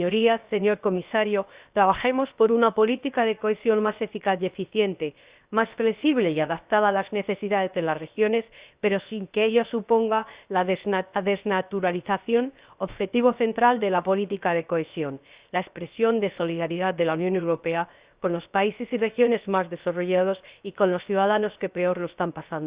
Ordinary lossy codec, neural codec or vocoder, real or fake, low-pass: Opus, 64 kbps; codec, 16 kHz, 0.7 kbps, FocalCodec; fake; 3.6 kHz